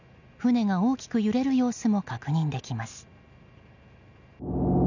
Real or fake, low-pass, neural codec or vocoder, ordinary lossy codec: real; 7.2 kHz; none; none